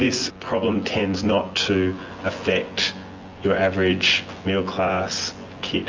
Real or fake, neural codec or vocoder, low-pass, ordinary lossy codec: fake; vocoder, 24 kHz, 100 mel bands, Vocos; 7.2 kHz; Opus, 32 kbps